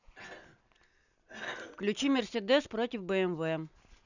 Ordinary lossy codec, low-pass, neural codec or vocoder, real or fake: none; 7.2 kHz; none; real